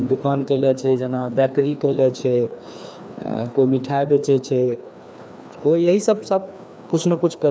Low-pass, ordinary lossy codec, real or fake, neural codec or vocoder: none; none; fake; codec, 16 kHz, 2 kbps, FreqCodec, larger model